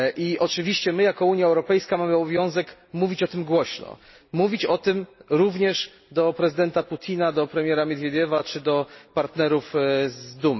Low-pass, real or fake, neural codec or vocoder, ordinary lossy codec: 7.2 kHz; real; none; MP3, 24 kbps